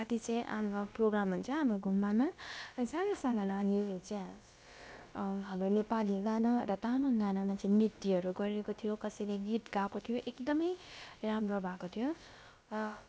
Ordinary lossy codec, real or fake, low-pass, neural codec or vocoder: none; fake; none; codec, 16 kHz, about 1 kbps, DyCAST, with the encoder's durations